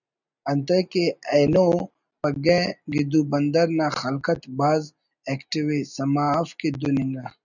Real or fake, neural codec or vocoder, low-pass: real; none; 7.2 kHz